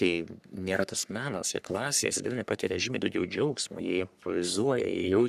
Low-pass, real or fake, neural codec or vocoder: 14.4 kHz; fake; codec, 44.1 kHz, 3.4 kbps, Pupu-Codec